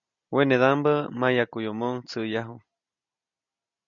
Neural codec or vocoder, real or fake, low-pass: none; real; 7.2 kHz